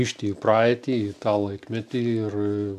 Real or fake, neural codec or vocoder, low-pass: real; none; 14.4 kHz